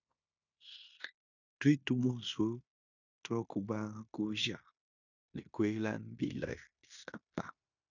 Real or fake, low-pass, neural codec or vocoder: fake; 7.2 kHz; codec, 16 kHz in and 24 kHz out, 0.9 kbps, LongCat-Audio-Codec, fine tuned four codebook decoder